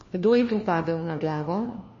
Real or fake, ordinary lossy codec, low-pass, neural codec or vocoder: fake; MP3, 32 kbps; 7.2 kHz; codec, 16 kHz, 1 kbps, FunCodec, trained on LibriTTS, 50 frames a second